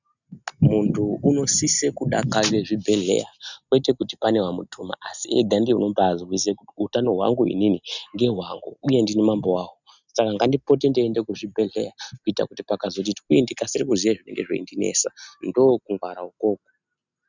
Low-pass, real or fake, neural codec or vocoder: 7.2 kHz; real; none